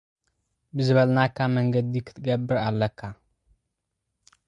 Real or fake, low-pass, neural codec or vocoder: real; 10.8 kHz; none